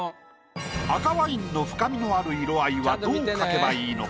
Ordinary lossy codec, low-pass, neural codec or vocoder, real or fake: none; none; none; real